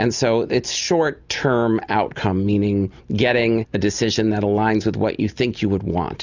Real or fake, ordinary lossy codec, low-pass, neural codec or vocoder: real; Opus, 64 kbps; 7.2 kHz; none